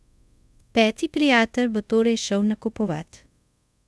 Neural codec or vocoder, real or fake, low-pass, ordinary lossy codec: codec, 24 kHz, 0.5 kbps, DualCodec; fake; none; none